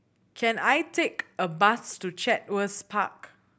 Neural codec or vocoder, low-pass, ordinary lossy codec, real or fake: none; none; none; real